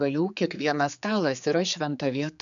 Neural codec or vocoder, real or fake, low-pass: codec, 16 kHz, 4 kbps, X-Codec, HuBERT features, trained on general audio; fake; 7.2 kHz